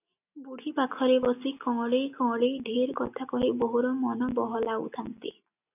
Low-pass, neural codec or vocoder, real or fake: 3.6 kHz; none; real